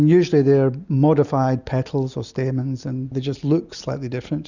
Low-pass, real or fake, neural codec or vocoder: 7.2 kHz; real; none